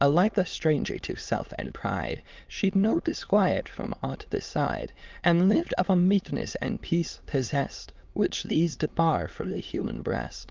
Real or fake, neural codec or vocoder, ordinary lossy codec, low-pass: fake; autoencoder, 22.05 kHz, a latent of 192 numbers a frame, VITS, trained on many speakers; Opus, 24 kbps; 7.2 kHz